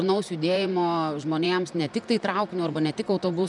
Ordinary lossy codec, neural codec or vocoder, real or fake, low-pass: MP3, 96 kbps; vocoder, 44.1 kHz, 128 mel bands, Pupu-Vocoder; fake; 10.8 kHz